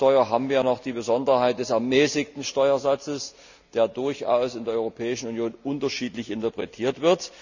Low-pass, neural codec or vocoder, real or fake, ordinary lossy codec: 7.2 kHz; none; real; none